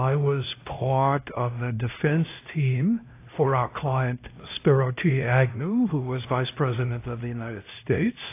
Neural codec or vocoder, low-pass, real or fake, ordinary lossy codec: codec, 16 kHz, 1 kbps, X-Codec, HuBERT features, trained on LibriSpeech; 3.6 kHz; fake; AAC, 24 kbps